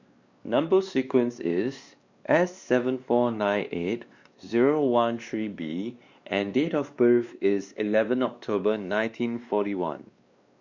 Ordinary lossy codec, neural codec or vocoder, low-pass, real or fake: Opus, 64 kbps; codec, 16 kHz, 2 kbps, X-Codec, WavLM features, trained on Multilingual LibriSpeech; 7.2 kHz; fake